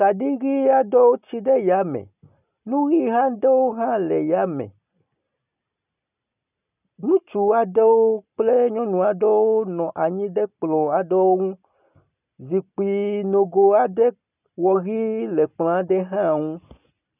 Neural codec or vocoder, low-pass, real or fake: none; 3.6 kHz; real